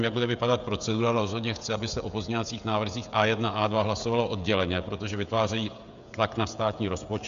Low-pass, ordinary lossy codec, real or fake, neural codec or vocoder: 7.2 kHz; Opus, 64 kbps; fake; codec, 16 kHz, 16 kbps, FreqCodec, smaller model